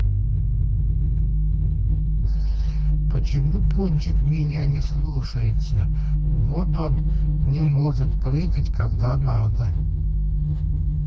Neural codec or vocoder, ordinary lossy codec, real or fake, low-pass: codec, 16 kHz, 2 kbps, FreqCodec, smaller model; none; fake; none